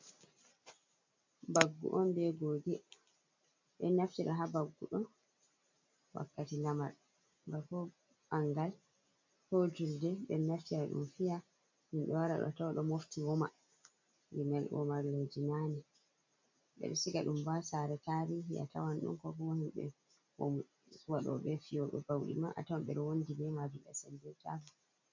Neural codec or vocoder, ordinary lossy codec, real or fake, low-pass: none; MP3, 48 kbps; real; 7.2 kHz